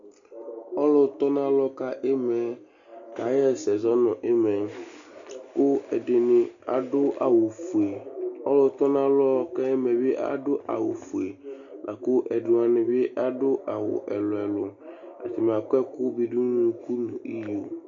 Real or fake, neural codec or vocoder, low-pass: real; none; 7.2 kHz